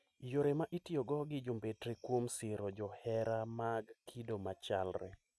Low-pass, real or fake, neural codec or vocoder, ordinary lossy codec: none; real; none; none